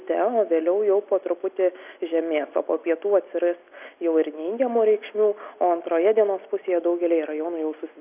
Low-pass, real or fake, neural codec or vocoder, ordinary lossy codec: 3.6 kHz; real; none; AAC, 32 kbps